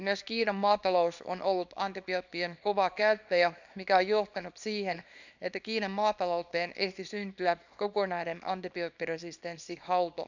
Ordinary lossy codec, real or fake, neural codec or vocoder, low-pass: none; fake; codec, 24 kHz, 0.9 kbps, WavTokenizer, small release; 7.2 kHz